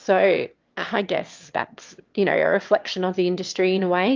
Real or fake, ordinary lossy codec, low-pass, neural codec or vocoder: fake; Opus, 24 kbps; 7.2 kHz; autoencoder, 22.05 kHz, a latent of 192 numbers a frame, VITS, trained on one speaker